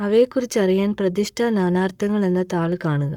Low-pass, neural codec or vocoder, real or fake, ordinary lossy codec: 19.8 kHz; codec, 44.1 kHz, 7.8 kbps, Pupu-Codec; fake; none